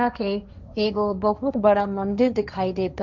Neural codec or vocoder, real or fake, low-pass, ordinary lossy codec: codec, 16 kHz, 1.1 kbps, Voila-Tokenizer; fake; 7.2 kHz; none